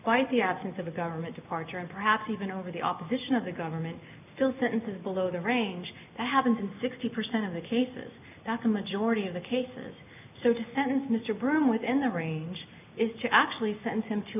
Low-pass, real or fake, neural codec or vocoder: 3.6 kHz; real; none